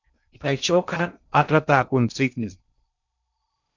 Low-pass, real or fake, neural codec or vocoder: 7.2 kHz; fake; codec, 16 kHz in and 24 kHz out, 0.6 kbps, FocalCodec, streaming, 2048 codes